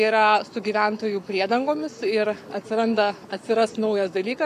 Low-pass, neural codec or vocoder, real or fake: 14.4 kHz; codec, 44.1 kHz, 7.8 kbps, Pupu-Codec; fake